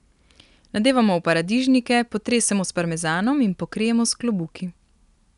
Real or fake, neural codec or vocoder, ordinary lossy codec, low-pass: real; none; none; 10.8 kHz